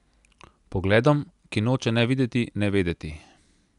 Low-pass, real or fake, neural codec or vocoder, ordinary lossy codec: 10.8 kHz; real; none; none